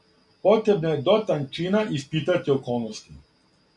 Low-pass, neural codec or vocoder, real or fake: 10.8 kHz; none; real